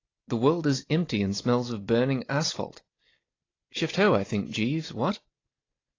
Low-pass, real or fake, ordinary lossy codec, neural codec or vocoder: 7.2 kHz; real; AAC, 32 kbps; none